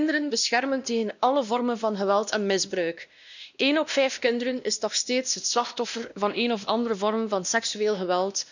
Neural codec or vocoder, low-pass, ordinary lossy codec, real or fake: codec, 16 kHz, 1 kbps, X-Codec, WavLM features, trained on Multilingual LibriSpeech; 7.2 kHz; none; fake